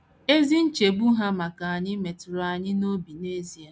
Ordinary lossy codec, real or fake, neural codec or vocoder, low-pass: none; real; none; none